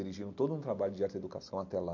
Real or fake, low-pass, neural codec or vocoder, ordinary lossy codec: real; 7.2 kHz; none; MP3, 48 kbps